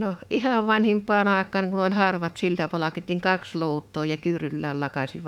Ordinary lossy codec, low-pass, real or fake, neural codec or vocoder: none; 19.8 kHz; fake; autoencoder, 48 kHz, 32 numbers a frame, DAC-VAE, trained on Japanese speech